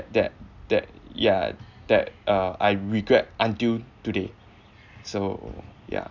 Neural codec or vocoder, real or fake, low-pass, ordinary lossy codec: none; real; 7.2 kHz; none